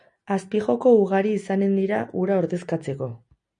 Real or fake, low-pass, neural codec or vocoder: real; 9.9 kHz; none